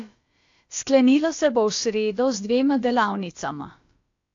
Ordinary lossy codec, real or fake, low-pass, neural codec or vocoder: AAC, 48 kbps; fake; 7.2 kHz; codec, 16 kHz, about 1 kbps, DyCAST, with the encoder's durations